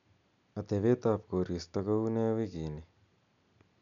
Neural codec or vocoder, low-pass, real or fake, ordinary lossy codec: none; 7.2 kHz; real; none